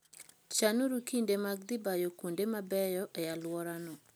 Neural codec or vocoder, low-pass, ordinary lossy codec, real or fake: none; none; none; real